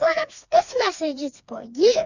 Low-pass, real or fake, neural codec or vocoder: 7.2 kHz; fake; codec, 16 kHz, 2 kbps, FreqCodec, smaller model